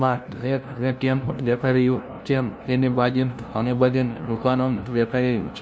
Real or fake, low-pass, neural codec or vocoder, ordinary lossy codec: fake; none; codec, 16 kHz, 0.5 kbps, FunCodec, trained on LibriTTS, 25 frames a second; none